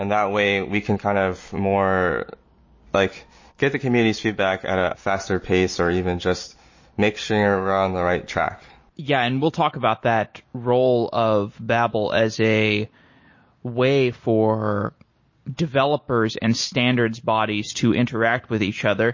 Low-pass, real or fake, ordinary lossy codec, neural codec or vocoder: 7.2 kHz; real; MP3, 32 kbps; none